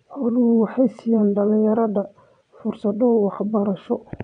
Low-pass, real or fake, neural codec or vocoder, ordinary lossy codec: 9.9 kHz; fake; vocoder, 22.05 kHz, 80 mel bands, WaveNeXt; MP3, 96 kbps